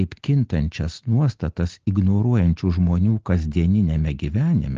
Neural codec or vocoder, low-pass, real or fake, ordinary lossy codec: none; 7.2 kHz; real; Opus, 16 kbps